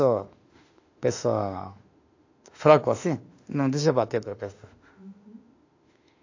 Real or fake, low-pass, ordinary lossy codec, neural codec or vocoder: fake; 7.2 kHz; AAC, 48 kbps; autoencoder, 48 kHz, 32 numbers a frame, DAC-VAE, trained on Japanese speech